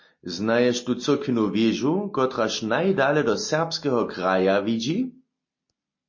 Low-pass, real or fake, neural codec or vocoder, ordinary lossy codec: 7.2 kHz; real; none; MP3, 32 kbps